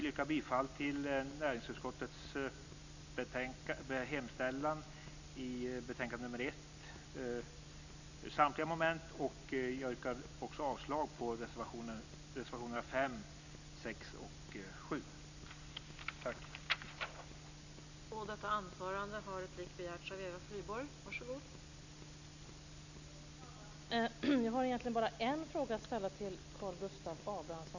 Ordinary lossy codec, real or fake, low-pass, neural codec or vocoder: none; real; 7.2 kHz; none